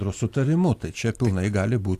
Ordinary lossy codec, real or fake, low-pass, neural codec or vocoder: AAC, 64 kbps; real; 14.4 kHz; none